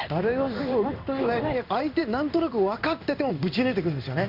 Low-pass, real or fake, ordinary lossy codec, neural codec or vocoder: 5.4 kHz; fake; none; codec, 16 kHz in and 24 kHz out, 1 kbps, XY-Tokenizer